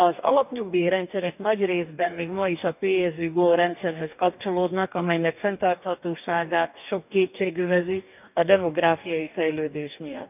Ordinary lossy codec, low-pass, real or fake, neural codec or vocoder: none; 3.6 kHz; fake; codec, 44.1 kHz, 2.6 kbps, DAC